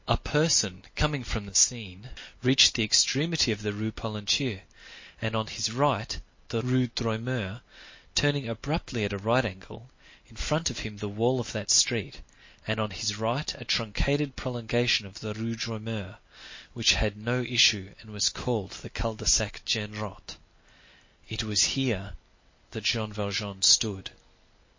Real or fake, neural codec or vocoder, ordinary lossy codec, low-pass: real; none; MP3, 32 kbps; 7.2 kHz